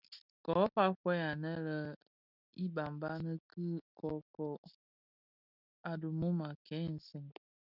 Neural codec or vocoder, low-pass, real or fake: none; 5.4 kHz; real